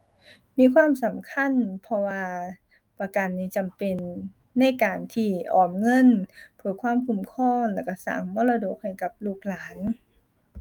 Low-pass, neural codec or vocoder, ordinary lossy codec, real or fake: 19.8 kHz; autoencoder, 48 kHz, 128 numbers a frame, DAC-VAE, trained on Japanese speech; Opus, 32 kbps; fake